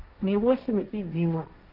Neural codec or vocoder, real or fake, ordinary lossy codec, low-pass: codec, 16 kHz, 1.1 kbps, Voila-Tokenizer; fake; Opus, 24 kbps; 5.4 kHz